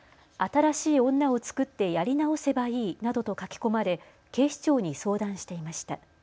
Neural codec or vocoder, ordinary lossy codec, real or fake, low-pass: none; none; real; none